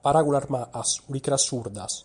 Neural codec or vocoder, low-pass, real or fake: none; 10.8 kHz; real